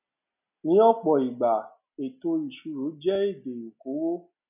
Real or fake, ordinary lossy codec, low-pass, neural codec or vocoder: real; none; 3.6 kHz; none